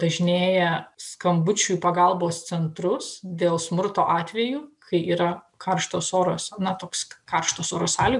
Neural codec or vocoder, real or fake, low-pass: none; real; 10.8 kHz